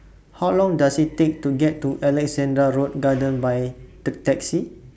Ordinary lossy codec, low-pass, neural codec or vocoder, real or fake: none; none; none; real